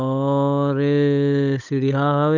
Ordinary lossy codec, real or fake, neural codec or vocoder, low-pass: none; fake; codec, 16 kHz, 8 kbps, FunCodec, trained on Chinese and English, 25 frames a second; 7.2 kHz